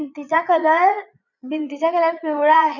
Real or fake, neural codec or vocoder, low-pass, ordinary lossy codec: fake; vocoder, 22.05 kHz, 80 mel bands, Vocos; 7.2 kHz; none